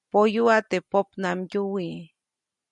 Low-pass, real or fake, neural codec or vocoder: 10.8 kHz; real; none